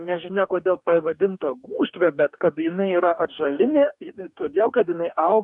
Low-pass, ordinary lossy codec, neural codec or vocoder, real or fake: 10.8 kHz; MP3, 96 kbps; codec, 44.1 kHz, 2.6 kbps, DAC; fake